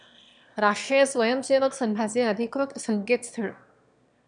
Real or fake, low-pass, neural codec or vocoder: fake; 9.9 kHz; autoencoder, 22.05 kHz, a latent of 192 numbers a frame, VITS, trained on one speaker